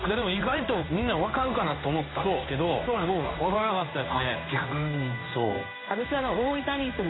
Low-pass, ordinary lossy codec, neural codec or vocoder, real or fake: 7.2 kHz; AAC, 16 kbps; codec, 16 kHz in and 24 kHz out, 1 kbps, XY-Tokenizer; fake